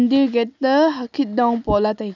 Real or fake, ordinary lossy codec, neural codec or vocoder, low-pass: real; none; none; 7.2 kHz